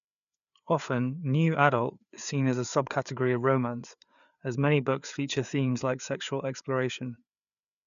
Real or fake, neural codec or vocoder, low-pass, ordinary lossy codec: fake; codec, 16 kHz, 4 kbps, FreqCodec, larger model; 7.2 kHz; none